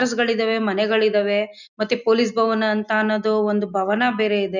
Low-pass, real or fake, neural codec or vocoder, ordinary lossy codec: 7.2 kHz; real; none; none